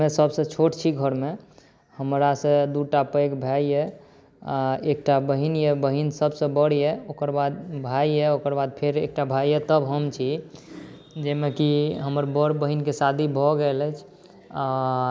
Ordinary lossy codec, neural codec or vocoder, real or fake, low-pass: none; none; real; none